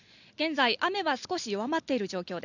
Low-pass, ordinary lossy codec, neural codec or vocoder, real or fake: 7.2 kHz; none; none; real